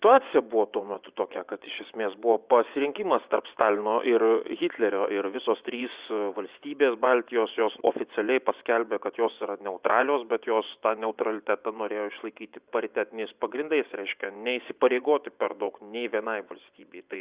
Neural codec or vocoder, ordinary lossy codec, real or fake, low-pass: vocoder, 24 kHz, 100 mel bands, Vocos; Opus, 64 kbps; fake; 3.6 kHz